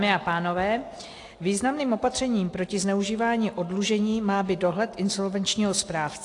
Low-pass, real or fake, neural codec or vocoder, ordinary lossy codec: 10.8 kHz; real; none; AAC, 48 kbps